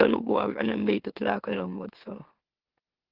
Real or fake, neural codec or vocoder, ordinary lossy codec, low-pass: fake; autoencoder, 44.1 kHz, a latent of 192 numbers a frame, MeloTTS; Opus, 16 kbps; 5.4 kHz